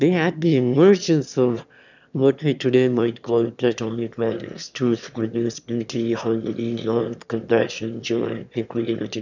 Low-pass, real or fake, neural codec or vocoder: 7.2 kHz; fake; autoencoder, 22.05 kHz, a latent of 192 numbers a frame, VITS, trained on one speaker